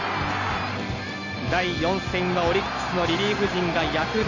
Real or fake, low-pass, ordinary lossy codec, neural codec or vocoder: real; 7.2 kHz; none; none